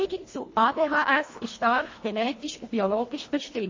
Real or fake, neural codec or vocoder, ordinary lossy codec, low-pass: fake; codec, 24 kHz, 1.5 kbps, HILCodec; MP3, 32 kbps; 7.2 kHz